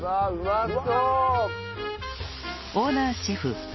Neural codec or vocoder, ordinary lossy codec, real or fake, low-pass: none; MP3, 24 kbps; real; 7.2 kHz